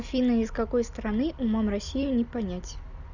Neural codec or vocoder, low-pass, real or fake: none; 7.2 kHz; real